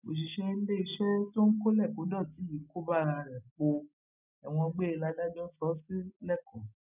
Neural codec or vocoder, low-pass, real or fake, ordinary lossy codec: none; 3.6 kHz; real; none